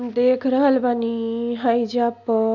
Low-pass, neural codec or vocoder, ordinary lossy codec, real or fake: 7.2 kHz; none; none; real